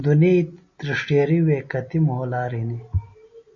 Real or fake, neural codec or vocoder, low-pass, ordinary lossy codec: real; none; 7.2 kHz; MP3, 32 kbps